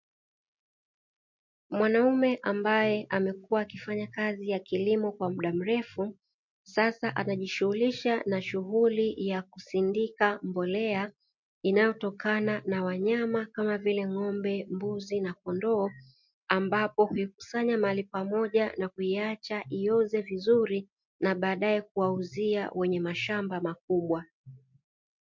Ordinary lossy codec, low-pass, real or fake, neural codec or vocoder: MP3, 48 kbps; 7.2 kHz; real; none